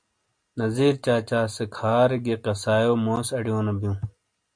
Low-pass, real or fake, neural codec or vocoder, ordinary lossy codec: 9.9 kHz; real; none; MP3, 64 kbps